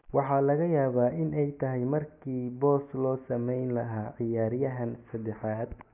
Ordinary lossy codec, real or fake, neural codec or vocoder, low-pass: none; real; none; 3.6 kHz